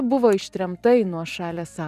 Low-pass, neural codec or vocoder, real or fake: 14.4 kHz; vocoder, 44.1 kHz, 128 mel bands every 256 samples, BigVGAN v2; fake